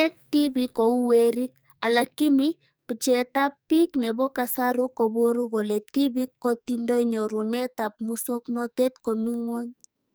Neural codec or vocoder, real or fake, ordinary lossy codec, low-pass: codec, 44.1 kHz, 2.6 kbps, SNAC; fake; none; none